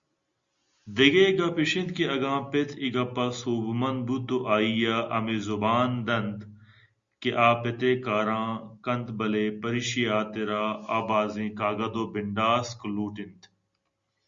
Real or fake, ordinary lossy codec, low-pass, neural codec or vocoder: real; Opus, 64 kbps; 7.2 kHz; none